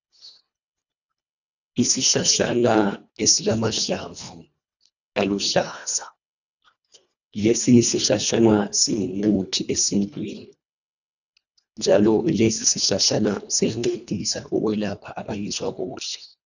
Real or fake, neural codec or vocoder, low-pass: fake; codec, 24 kHz, 1.5 kbps, HILCodec; 7.2 kHz